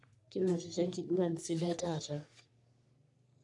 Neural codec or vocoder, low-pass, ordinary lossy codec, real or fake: codec, 44.1 kHz, 3.4 kbps, Pupu-Codec; 10.8 kHz; none; fake